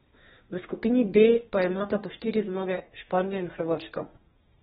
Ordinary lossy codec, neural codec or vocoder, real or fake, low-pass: AAC, 16 kbps; codec, 32 kHz, 1.9 kbps, SNAC; fake; 14.4 kHz